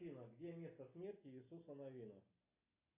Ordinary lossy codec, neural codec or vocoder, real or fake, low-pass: AAC, 24 kbps; none; real; 3.6 kHz